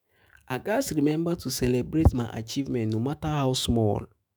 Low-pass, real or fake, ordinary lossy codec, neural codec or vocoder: none; fake; none; autoencoder, 48 kHz, 128 numbers a frame, DAC-VAE, trained on Japanese speech